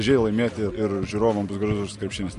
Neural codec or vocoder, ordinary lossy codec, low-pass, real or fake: none; MP3, 48 kbps; 14.4 kHz; real